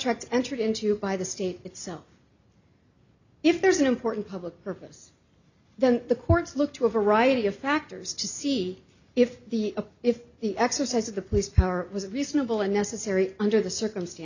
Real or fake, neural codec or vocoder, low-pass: real; none; 7.2 kHz